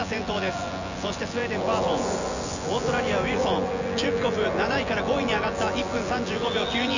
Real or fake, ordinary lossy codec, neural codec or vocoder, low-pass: fake; none; vocoder, 24 kHz, 100 mel bands, Vocos; 7.2 kHz